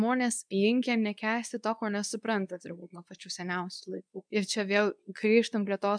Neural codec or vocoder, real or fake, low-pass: codec, 24 kHz, 0.9 kbps, WavTokenizer, medium speech release version 2; fake; 9.9 kHz